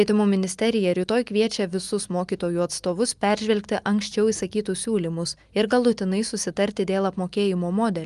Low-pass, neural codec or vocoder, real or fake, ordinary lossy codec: 10.8 kHz; none; real; Opus, 32 kbps